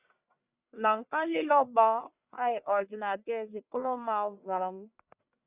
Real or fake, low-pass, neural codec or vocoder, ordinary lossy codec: fake; 3.6 kHz; codec, 44.1 kHz, 1.7 kbps, Pupu-Codec; Opus, 64 kbps